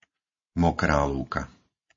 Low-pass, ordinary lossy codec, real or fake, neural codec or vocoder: 7.2 kHz; MP3, 32 kbps; real; none